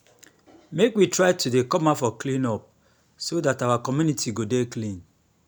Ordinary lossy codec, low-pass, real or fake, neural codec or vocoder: none; none; real; none